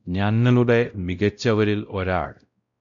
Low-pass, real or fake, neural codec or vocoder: 7.2 kHz; fake; codec, 16 kHz, 0.5 kbps, X-Codec, WavLM features, trained on Multilingual LibriSpeech